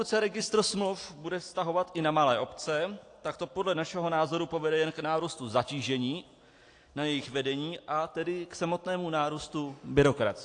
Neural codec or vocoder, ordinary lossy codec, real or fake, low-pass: none; AAC, 48 kbps; real; 9.9 kHz